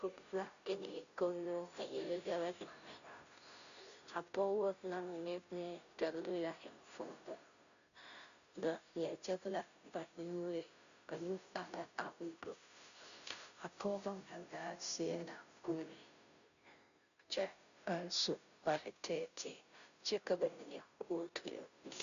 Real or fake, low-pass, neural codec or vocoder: fake; 7.2 kHz; codec, 16 kHz, 0.5 kbps, FunCodec, trained on Chinese and English, 25 frames a second